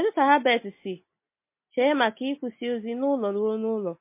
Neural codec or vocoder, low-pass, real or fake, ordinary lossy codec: none; 3.6 kHz; real; MP3, 24 kbps